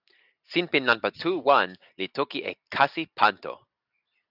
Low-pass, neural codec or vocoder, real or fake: 5.4 kHz; none; real